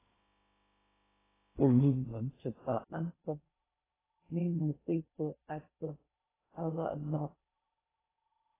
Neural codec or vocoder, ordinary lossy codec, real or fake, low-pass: codec, 16 kHz in and 24 kHz out, 0.6 kbps, FocalCodec, streaming, 2048 codes; AAC, 16 kbps; fake; 3.6 kHz